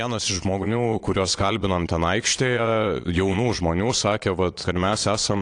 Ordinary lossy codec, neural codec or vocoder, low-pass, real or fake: AAC, 48 kbps; vocoder, 22.05 kHz, 80 mel bands, Vocos; 9.9 kHz; fake